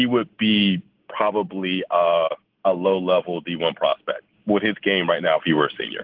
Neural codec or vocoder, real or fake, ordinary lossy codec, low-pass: none; real; Opus, 16 kbps; 5.4 kHz